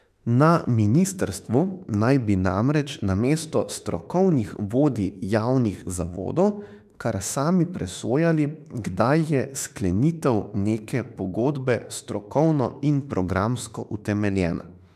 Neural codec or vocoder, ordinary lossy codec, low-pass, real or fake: autoencoder, 48 kHz, 32 numbers a frame, DAC-VAE, trained on Japanese speech; none; 14.4 kHz; fake